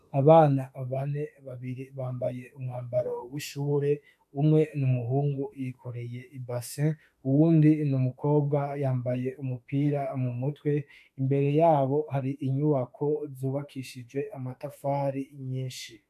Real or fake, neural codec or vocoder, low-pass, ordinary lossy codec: fake; autoencoder, 48 kHz, 32 numbers a frame, DAC-VAE, trained on Japanese speech; 14.4 kHz; AAC, 96 kbps